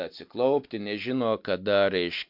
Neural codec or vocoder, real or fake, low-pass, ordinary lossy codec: none; real; 5.4 kHz; MP3, 48 kbps